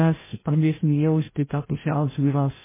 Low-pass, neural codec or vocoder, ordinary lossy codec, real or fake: 3.6 kHz; codec, 16 kHz, 0.5 kbps, FreqCodec, larger model; MP3, 16 kbps; fake